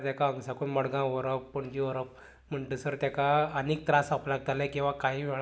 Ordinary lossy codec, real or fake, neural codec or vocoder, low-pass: none; real; none; none